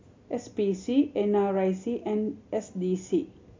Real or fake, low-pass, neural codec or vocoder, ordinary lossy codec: real; 7.2 kHz; none; MP3, 48 kbps